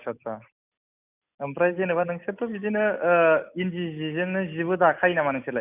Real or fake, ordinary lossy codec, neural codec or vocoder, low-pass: real; none; none; 3.6 kHz